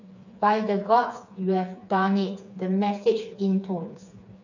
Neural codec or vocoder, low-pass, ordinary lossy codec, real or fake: codec, 16 kHz, 4 kbps, FreqCodec, smaller model; 7.2 kHz; none; fake